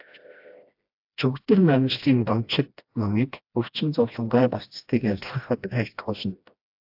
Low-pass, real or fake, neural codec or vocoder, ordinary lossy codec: 5.4 kHz; fake; codec, 16 kHz, 1 kbps, FreqCodec, smaller model; AAC, 48 kbps